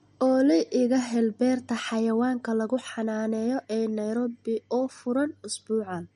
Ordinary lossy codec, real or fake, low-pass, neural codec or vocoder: MP3, 48 kbps; real; 19.8 kHz; none